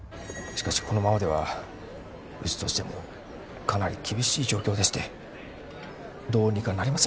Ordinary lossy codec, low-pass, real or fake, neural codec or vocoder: none; none; real; none